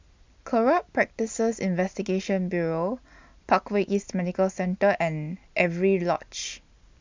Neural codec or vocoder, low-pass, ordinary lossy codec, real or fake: none; 7.2 kHz; MP3, 64 kbps; real